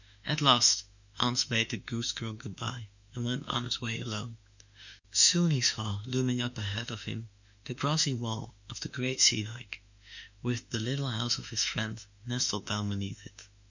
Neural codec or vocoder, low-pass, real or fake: autoencoder, 48 kHz, 32 numbers a frame, DAC-VAE, trained on Japanese speech; 7.2 kHz; fake